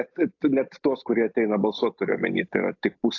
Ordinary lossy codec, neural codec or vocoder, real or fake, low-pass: AAC, 48 kbps; none; real; 7.2 kHz